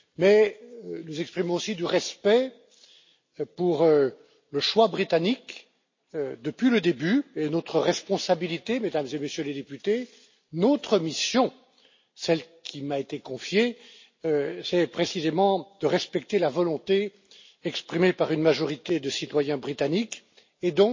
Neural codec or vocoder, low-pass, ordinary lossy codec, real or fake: none; 7.2 kHz; MP3, 32 kbps; real